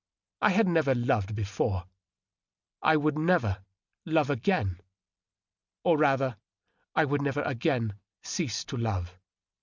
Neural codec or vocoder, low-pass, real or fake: none; 7.2 kHz; real